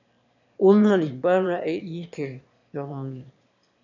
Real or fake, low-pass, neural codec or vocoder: fake; 7.2 kHz; autoencoder, 22.05 kHz, a latent of 192 numbers a frame, VITS, trained on one speaker